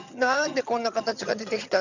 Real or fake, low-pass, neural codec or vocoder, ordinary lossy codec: fake; 7.2 kHz; vocoder, 22.05 kHz, 80 mel bands, HiFi-GAN; none